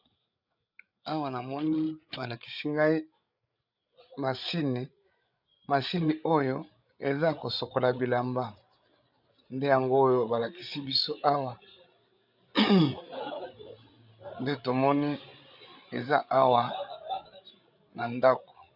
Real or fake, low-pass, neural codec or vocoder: fake; 5.4 kHz; codec, 16 kHz, 8 kbps, FreqCodec, larger model